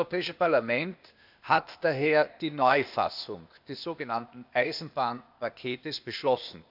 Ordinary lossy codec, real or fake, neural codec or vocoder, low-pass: none; fake; codec, 16 kHz, 0.8 kbps, ZipCodec; 5.4 kHz